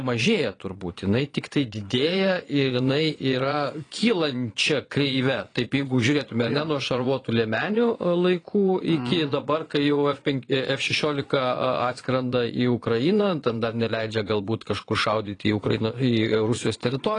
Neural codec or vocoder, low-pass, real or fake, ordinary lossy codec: vocoder, 22.05 kHz, 80 mel bands, Vocos; 9.9 kHz; fake; AAC, 32 kbps